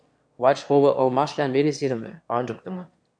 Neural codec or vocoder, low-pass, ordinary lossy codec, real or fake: autoencoder, 22.05 kHz, a latent of 192 numbers a frame, VITS, trained on one speaker; 9.9 kHz; MP3, 64 kbps; fake